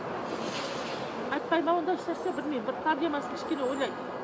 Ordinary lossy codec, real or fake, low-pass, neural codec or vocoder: none; real; none; none